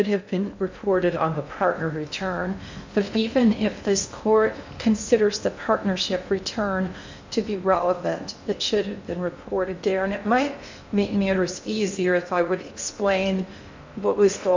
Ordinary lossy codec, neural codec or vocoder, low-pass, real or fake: MP3, 64 kbps; codec, 16 kHz in and 24 kHz out, 0.6 kbps, FocalCodec, streaming, 2048 codes; 7.2 kHz; fake